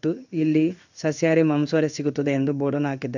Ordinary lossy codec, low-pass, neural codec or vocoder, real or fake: none; 7.2 kHz; codec, 16 kHz in and 24 kHz out, 1 kbps, XY-Tokenizer; fake